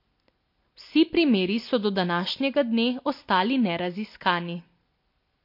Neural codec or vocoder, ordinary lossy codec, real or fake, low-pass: none; MP3, 32 kbps; real; 5.4 kHz